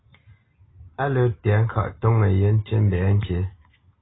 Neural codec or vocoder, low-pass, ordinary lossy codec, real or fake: none; 7.2 kHz; AAC, 16 kbps; real